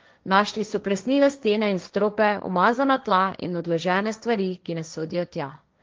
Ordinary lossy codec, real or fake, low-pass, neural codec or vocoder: Opus, 24 kbps; fake; 7.2 kHz; codec, 16 kHz, 1.1 kbps, Voila-Tokenizer